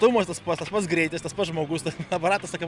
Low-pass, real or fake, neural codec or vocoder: 10.8 kHz; real; none